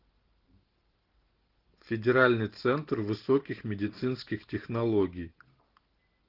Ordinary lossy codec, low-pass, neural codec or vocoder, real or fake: Opus, 16 kbps; 5.4 kHz; none; real